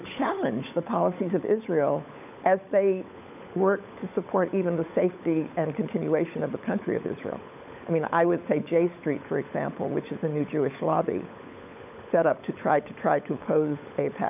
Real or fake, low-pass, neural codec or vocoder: fake; 3.6 kHz; codec, 16 kHz, 16 kbps, FunCodec, trained on LibriTTS, 50 frames a second